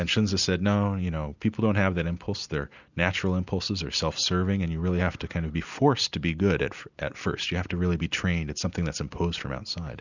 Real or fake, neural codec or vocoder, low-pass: real; none; 7.2 kHz